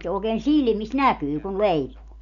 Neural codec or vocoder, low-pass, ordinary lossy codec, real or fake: none; 7.2 kHz; none; real